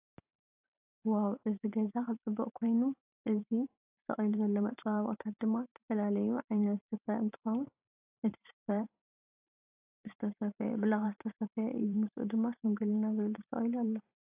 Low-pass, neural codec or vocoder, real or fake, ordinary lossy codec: 3.6 kHz; vocoder, 44.1 kHz, 128 mel bands every 512 samples, BigVGAN v2; fake; AAC, 32 kbps